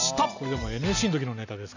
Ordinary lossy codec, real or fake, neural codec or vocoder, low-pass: none; real; none; 7.2 kHz